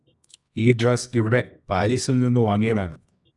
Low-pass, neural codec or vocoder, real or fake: 10.8 kHz; codec, 24 kHz, 0.9 kbps, WavTokenizer, medium music audio release; fake